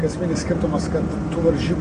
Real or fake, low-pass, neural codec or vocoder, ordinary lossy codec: real; 9.9 kHz; none; AAC, 48 kbps